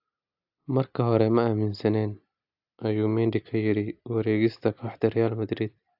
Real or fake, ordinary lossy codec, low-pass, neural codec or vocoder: real; AAC, 48 kbps; 5.4 kHz; none